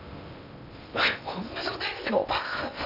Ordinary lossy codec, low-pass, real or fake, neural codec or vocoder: none; 5.4 kHz; fake; codec, 16 kHz in and 24 kHz out, 0.6 kbps, FocalCodec, streaming, 2048 codes